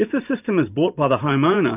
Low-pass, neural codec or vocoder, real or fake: 3.6 kHz; vocoder, 44.1 kHz, 128 mel bands every 512 samples, BigVGAN v2; fake